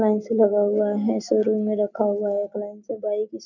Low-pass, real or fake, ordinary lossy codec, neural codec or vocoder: 7.2 kHz; real; none; none